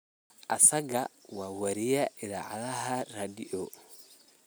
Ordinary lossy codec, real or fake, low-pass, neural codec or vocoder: none; real; none; none